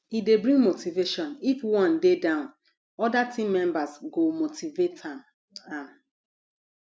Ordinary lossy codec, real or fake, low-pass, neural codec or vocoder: none; real; none; none